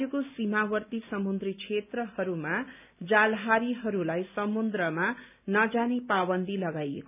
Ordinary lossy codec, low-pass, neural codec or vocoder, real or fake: none; 3.6 kHz; none; real